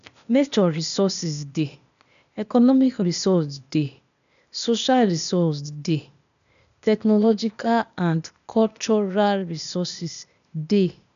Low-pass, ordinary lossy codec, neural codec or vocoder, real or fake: 7.2 kHz; none; codec, 16 kHz, 0.8 kbps, ZipCodec; fake